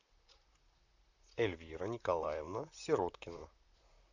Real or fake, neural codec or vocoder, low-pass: fake; vocoder, 44.1 kHz, 128 mel bands, Pupu-Vocoder; 7.2 kHz